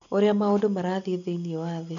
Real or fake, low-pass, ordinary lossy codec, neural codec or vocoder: real; 7.2 kHz; none; none